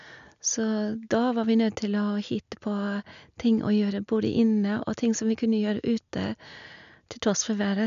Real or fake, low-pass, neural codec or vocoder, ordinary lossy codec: real; 7.2 kHz; none; none